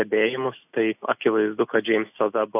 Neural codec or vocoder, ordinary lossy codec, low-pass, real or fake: none; AAC, 32 kbps; 3.6 kHz; real